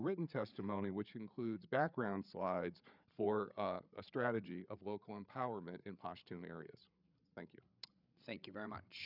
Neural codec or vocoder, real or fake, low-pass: codec, 16 kHz, 8 kbps, FreqCodec, larger model; fake; 5.4 kHz